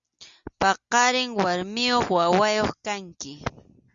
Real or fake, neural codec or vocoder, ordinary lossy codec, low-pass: real; none; Opus, 64 kbps; 7.2 kHz